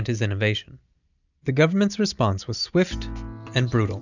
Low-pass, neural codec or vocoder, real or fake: 7.2 kHz; none; real